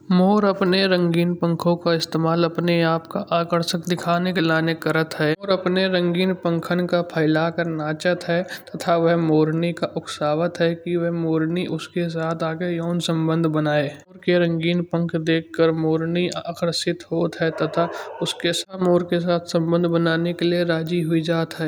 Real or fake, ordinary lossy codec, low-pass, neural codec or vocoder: real; none; none; none